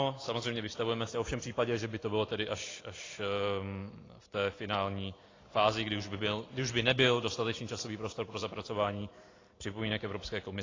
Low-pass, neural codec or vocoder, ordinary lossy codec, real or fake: 7.2 kHz; none; AAC, 32 kbps; real